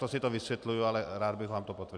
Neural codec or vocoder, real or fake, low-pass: none; real; 9.9 kHz